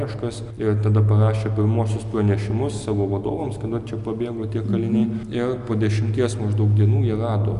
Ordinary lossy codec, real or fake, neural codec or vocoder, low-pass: AAC, 96 kbps; real; none; 10.8 kHz